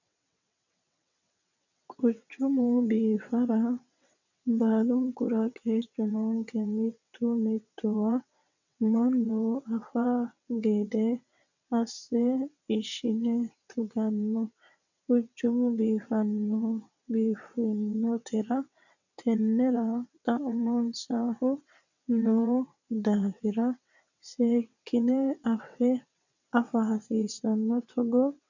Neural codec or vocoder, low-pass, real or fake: vocoder, 22.05 kHz, 80 mel bands, WaveNeXt; 7.2 kHz; fake